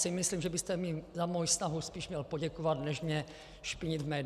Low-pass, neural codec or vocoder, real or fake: 14.4 kHz; none; real